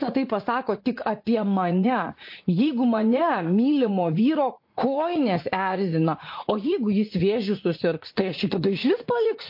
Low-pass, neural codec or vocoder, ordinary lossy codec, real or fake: 5.4 kHz; vocoder, 44.1 kHz, 80 mel bands, Vocos; MP3, 32 kbps; fake